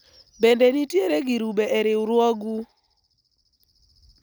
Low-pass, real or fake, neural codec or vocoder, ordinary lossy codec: none; real; none; none